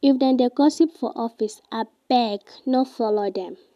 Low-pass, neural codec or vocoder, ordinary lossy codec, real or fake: 14.4 kHz; none; none; real